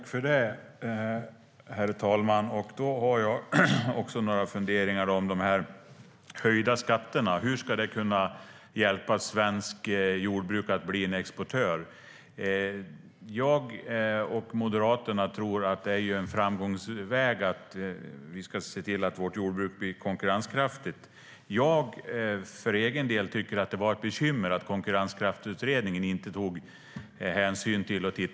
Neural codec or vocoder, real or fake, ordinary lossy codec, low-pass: none; real; none; none